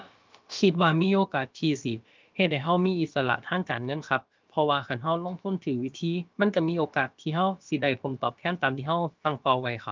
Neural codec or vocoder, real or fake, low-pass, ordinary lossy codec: codec, 16 kHz, about 1 kbps, DyCAST, with the encoder's durations; fake; 7.2 kHz; Opus, 24 kbps